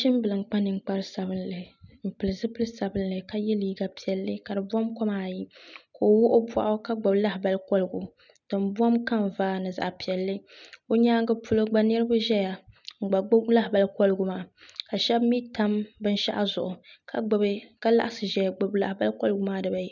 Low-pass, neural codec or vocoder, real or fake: 7.2 kHz; none; real